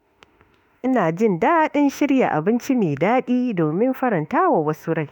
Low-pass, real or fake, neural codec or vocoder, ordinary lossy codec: 19.8 kHz; fake; autoencoder, 48 kHz, 32 numbers a frame, DAC-VAE, trained on Japanese speech; none